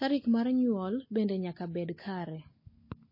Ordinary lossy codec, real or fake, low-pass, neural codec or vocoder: MP3, 24 kbps; real; 5.4 kHz; none